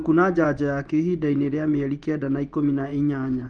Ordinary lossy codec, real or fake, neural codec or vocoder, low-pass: Opus, 32 kbps; fake; vocoder, 24 kHz, 100 mel bands, Vocos; 9.9 kHz